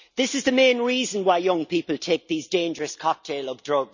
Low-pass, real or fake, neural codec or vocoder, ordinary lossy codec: 7.2 kHz; real; none; none